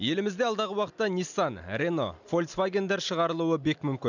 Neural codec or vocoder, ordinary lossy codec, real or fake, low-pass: none; none; real; 7.2 kHz